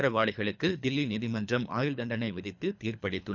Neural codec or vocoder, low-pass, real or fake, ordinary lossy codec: codec, 24 kHz, 3 kbps, HILCodec; 7.2 kHz; fake; none